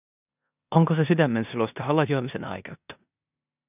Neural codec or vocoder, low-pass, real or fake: codec, 16 kHz in and 24 kHz out, 0.9 kbps, LongCat-Audio-Codec, four codebook decoder; 3.6 kHz; fake